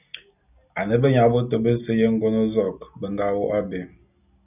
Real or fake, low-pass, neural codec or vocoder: real; 3.6 kHz; none